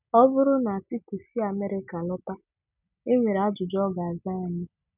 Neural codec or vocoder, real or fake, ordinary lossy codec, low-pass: none; real; none; 3.6 kHz